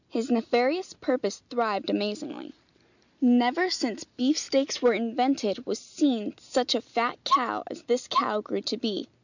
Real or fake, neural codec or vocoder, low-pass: real; none; 7.2 kHz